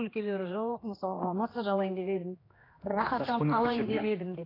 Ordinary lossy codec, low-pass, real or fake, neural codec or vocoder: AAC, 24 kbps; 5.4 kHz; fake; codec, 16 kHz, 2 kbps, X-Codec, HuBERT features, trained on general audio